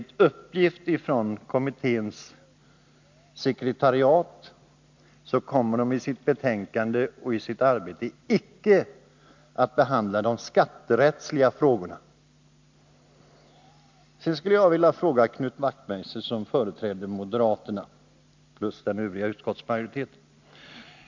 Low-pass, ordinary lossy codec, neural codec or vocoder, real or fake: 7.2 kHz; MP3, 64 kbps; none; real